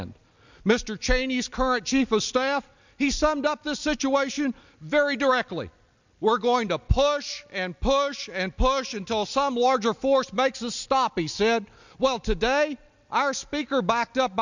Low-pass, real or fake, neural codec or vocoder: 7.2 kHz; real; none